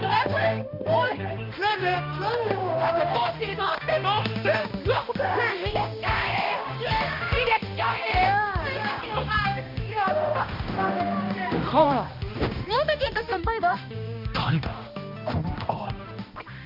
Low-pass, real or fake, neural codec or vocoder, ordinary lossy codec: 5.4 kHz; fake; codec, 16 kHz, 1 kbps, X-Codec, HuBERT features, trained on balanced general audio; MP3, 48 kbps